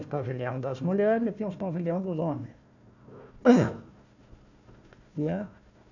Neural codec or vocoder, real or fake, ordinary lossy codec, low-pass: codec, 16 kHz, 1 kbps, FunCodec, trained on Chinese and English, 50 frames a second; fake; none; 7.2 kHz